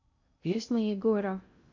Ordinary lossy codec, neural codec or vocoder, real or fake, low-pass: none; codec, 16 kHz in and 24 kHz out, 0.6 kbps, FocalCodec, streaming, 2048 codes; fake; 7.2 kHz